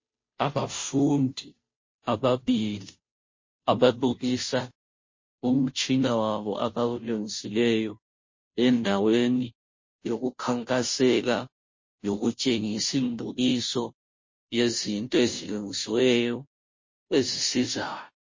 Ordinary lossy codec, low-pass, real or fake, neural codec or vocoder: MP3, 32 kbps; 7.2 kHz; fake; codec, 16 kHz, 0.5 kbps, FunCodec, trained on Chinese and English, 25 frames a second